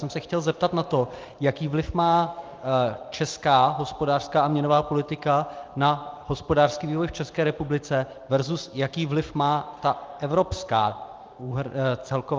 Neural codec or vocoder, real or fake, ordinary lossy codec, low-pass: none; real; Opus, 24 kbps; 7.2 kHz